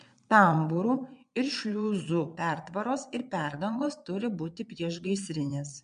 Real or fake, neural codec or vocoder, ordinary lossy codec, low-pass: fake; vocoder, 22.05 kHz, 80 mel bands, Vocos; MP3, 64 kbps; 9.9 kHz